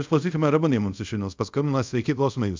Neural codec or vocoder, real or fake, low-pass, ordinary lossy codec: codec, 24 kHz, 0.5 kbps, DualCodec; fake; 7.2 kHz; MP3, 64 kbps